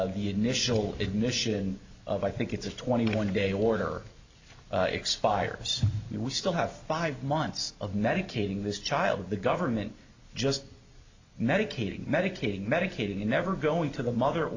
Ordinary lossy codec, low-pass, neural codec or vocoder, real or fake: AAC, 48 kbps; 7.2 kHz; none; real